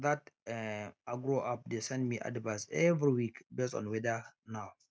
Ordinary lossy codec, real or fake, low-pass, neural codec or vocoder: none; real; none; none